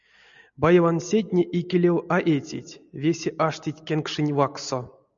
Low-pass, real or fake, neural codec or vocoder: 7.2 kHz; real; none